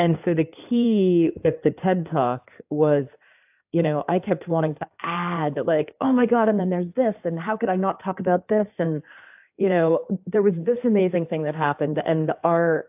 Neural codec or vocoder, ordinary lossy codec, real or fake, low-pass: codec, 16 kHz in and 24 kHz out, 2.2 kbps, FireRedTTS-2 codec; AAC, 32 kbps; fake; 3.6 kHz